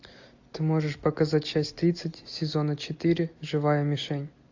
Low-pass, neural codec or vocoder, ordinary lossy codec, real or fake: 7.2 kHz; none; AAC, 48 kbps; real